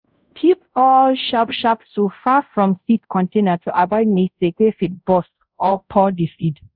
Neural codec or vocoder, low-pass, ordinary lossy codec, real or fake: codec, 24 kHz, 0.5 kbps, DualCodec; 5.4 kHz; none; fake